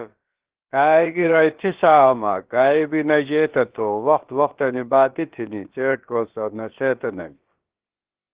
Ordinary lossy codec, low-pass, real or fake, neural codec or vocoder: Opus, 16 kbps; 3.6 kHz; fake; codec, 16 kHz, about 1 kbps, DyCAST, with the encoder's durations